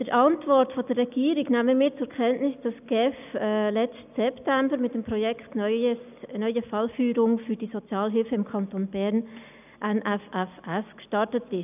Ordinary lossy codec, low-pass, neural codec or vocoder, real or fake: none; 3.6 kHz; none; real